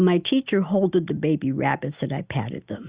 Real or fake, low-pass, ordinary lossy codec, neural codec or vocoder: real; 3.6 kHz; Opus, 64 kbps; none